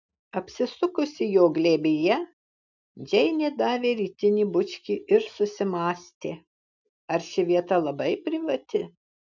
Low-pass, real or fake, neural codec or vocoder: 7.2 kHz; real; none